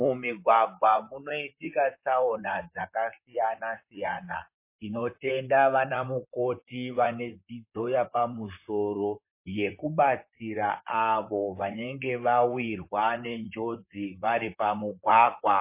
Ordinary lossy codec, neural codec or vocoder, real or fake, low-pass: MP3, 24 kbps; vocoder, 44.1 kHz, 128 mel bands, Pupu-Vocoder; fake; 3.6 kHz